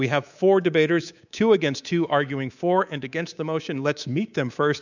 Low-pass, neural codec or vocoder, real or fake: 7.2 kHz; codec, 24 kHz, 3.1 kbps, DualCodec; fake